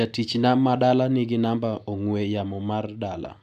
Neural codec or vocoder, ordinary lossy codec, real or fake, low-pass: none; none; real; 14.4 kHz